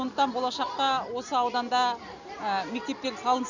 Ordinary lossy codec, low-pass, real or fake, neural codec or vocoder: none; 7.2 kHz; real; none